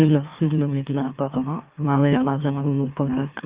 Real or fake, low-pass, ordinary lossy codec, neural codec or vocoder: fake; 3.6 kHz; Opus, 24 kbps; autoencoder, 44.1 kHz, a latent of 192 numbers a frame, MeloTTS